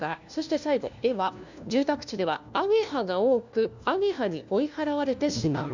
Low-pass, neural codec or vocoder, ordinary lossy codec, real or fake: 7.2 kHz; codec, 16 kHz, 1 kbps, FunCodec, trained on LibriTTS, 50 frames a second; none; fake